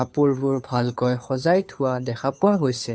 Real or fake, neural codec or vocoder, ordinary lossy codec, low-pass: fake; codec, 16 kHz, 2 kbps, FunCodec, trained on Chinese and English, 25 frames a second; none; none